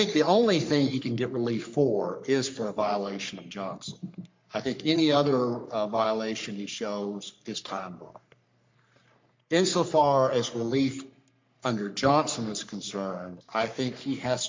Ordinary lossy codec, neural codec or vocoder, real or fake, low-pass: MP3, 48 kbps; codec, 44.1 kHz, 3.4 kbps, Pupu-Codec; fake; 7.2 kHz